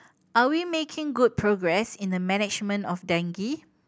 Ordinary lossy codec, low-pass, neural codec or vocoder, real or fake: none; none; none; real